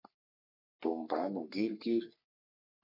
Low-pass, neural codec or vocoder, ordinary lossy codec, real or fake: 5.4 kHz; codec, 44.1 kHz, 3.4 kbps, Pupu-Codec; MP3, 32 kbps; fake